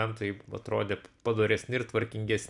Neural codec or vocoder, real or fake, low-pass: none; real; 10.8 kHz